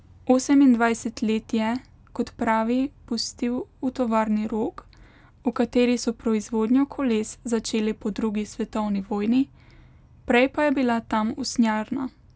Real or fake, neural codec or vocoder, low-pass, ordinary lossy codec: real; none; none; none